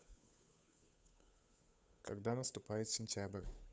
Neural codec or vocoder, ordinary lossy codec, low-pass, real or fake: codec, 16 kHz, 8 kbps, FunCodec, trained on Chinese and English, 25 frames a second; none; none; fake